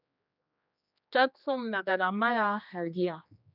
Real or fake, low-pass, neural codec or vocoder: fake; 5.4 kHz; codec, 16 kHz, 2 kbps, X-Codec, HuBERT features, trained on general audio